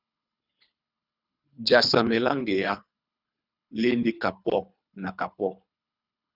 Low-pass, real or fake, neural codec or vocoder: 5.4 kHz; fake; codec, 24 kHz, 3 kbps, HILCodec